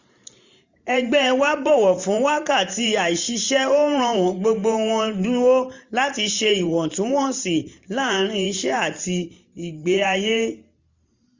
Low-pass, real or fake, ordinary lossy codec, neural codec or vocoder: 7.2 kHz; fake; Opus, 64 kbps; vocoder, 44.1 kHz, 128 mel bands every 512 samples, BigVGAN v2